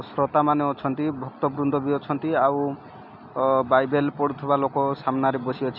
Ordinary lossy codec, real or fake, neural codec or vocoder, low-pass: none; real; none; 5.4 kHz